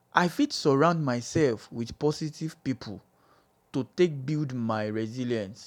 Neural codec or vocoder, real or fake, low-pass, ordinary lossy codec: none; real; 19.8 kHz; none